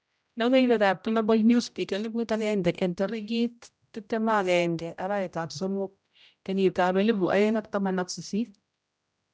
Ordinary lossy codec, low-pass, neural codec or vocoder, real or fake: none; none; codec, 16 kHz, 0.5 kbps, X-Codec, HuBERT features, trained on general audio; fake